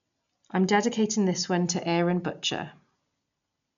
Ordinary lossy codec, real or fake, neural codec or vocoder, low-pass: none; real; none; 7.2 kHz